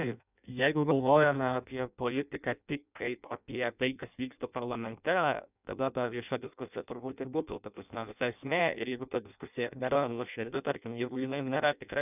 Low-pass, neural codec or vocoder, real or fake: 3.6 kHz; codec, 16 kHz in and 24 kHz out, 0.6 kbps, FireRedTTS-2 codec; fake